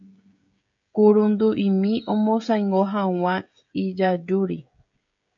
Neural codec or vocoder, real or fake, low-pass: codec, 16 kHz, 16 kbps, FreqCodec, smaller model; fake; 7.2 kHz